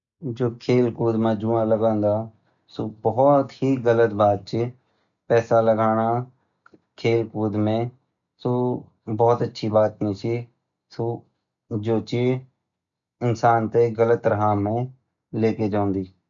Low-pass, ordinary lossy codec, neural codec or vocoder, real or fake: 7.2 kHz; none; none; real